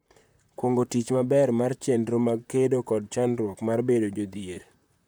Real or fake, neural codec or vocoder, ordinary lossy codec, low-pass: fake; vocoder, 44.1 kHz, 128 mel bands, Pupu-Vocoder; none; none